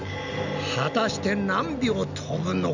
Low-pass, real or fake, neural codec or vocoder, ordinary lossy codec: 7.2 kHz; real; none; none